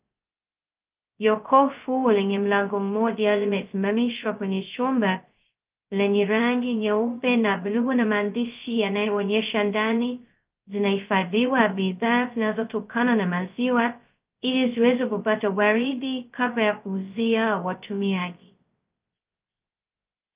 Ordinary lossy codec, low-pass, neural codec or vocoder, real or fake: Opus, 24 kbps; 3.6 kHz; codec, 16 kHz, 0.2 kbps, FocalCodec; fake